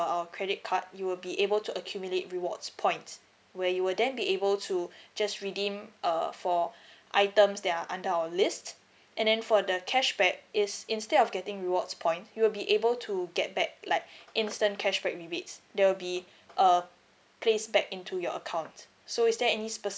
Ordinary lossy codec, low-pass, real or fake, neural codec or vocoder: none; none; real; none